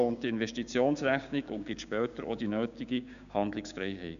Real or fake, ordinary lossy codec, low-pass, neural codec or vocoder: fake; none; 7.2 kHz; codec, 16 kHz, 6 kbps, DAC